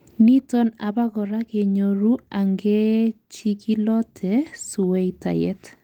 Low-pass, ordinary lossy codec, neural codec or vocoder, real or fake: 19.8 kHz; Opus, 24 kbps; none; real